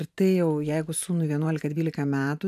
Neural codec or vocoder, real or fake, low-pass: vocoder, 44.1 kHz, 128 mel bands every 512 samples, BigVGAN v2; fake; 14.4 kHz